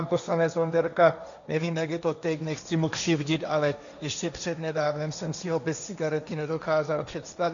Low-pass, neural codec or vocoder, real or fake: 7.2 kHz; codec, 16 kHz, 1.1 kbps, Voila-Tokenizer; fake